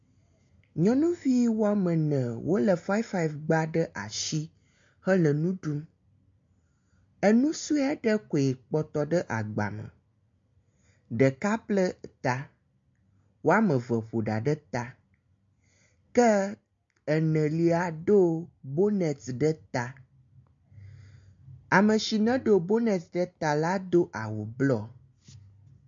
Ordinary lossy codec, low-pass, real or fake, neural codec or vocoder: MP3, 48 kbps; 7.2 kHz; real; none